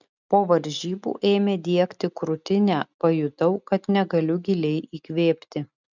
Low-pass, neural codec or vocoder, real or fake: 7.2 kHz; none; real